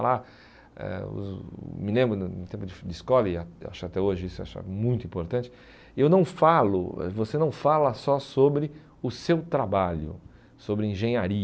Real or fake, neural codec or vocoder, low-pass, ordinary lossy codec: real; none; none; none